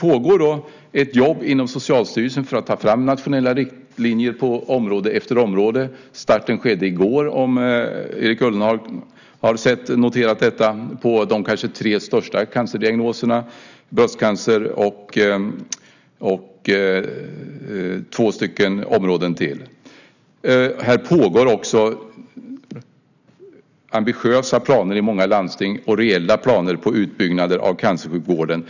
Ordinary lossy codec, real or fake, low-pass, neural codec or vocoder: none; real; 7.2 kHz; none